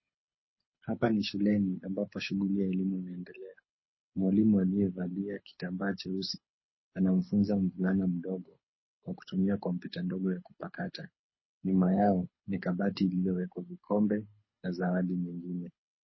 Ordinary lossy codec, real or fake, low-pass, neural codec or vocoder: MP3, 24 kbps; fake; 7.2 kHz; codec, 24 kHz, 6 kbps, HILCodec